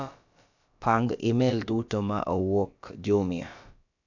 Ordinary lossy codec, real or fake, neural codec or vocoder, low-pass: none; fake; codec, 16 kHz, about 1 kbps, DyCAST, with the encoder's durations; 7.2 kHz